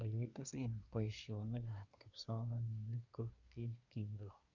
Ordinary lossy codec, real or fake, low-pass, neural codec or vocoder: none; fake; 7.2 kHz; codec, 24 kHz, 1 kbps, SNAC